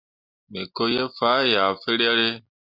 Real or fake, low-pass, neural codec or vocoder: real; 5.4 kHz; none